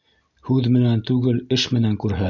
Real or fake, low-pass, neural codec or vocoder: real; 7.2 kHz; none